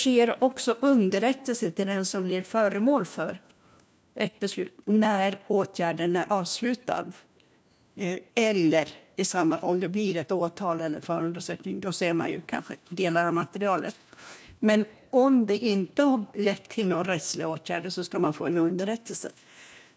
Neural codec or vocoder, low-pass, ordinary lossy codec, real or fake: codec, 16 kHz, 1 kbps, FunCodec, trained on LibriTTS, 50 frames a second; none; none; fake